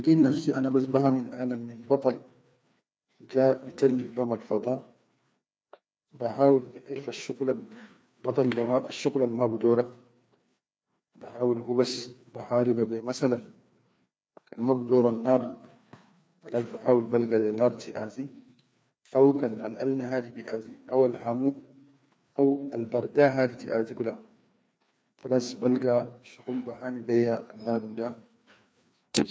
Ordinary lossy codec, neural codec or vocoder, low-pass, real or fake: none; codec, 16 kHz, 2 kbps, FreqCodec, larger model; none; fake